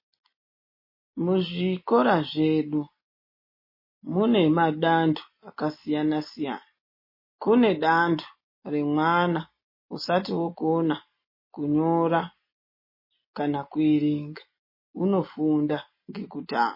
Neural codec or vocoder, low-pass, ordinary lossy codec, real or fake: none; 5.4 kHz; MP3, 24 kbps; real